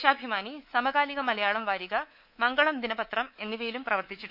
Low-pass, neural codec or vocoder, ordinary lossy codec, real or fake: 5.4 kHz; codec, 24 kHz, 3.1 kbps, DualCodec; none; fake